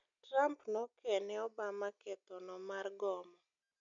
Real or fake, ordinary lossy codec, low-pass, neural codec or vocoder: real; MP3, 64 kbps; 7.2 kHz; none